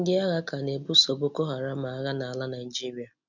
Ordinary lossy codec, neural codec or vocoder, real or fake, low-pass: none; none; real; 7.2 kHz